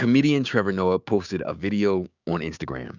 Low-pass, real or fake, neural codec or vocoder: 7.2 kHz; fake; codec, 16 kHz, 6 kbps, DAC